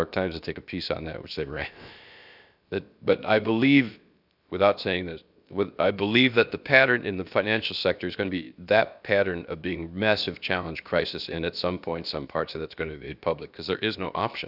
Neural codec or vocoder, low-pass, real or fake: codec, 16 kHz, about 1 kbps, DyCAST, with the encoder's durations; 5.4 kHz; fake